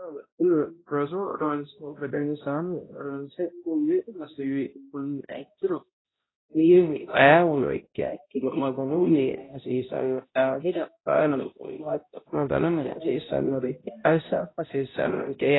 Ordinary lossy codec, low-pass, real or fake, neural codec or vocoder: AAC, 16 kbps; 7.2 kHz; fake; codec, 16 kHz, 0.5 kbps, X-Codec, HuBERT features, trained on balanced general audio